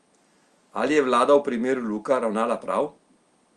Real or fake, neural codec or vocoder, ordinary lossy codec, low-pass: real; none; Opus, 32 kbps; 10.8 kHz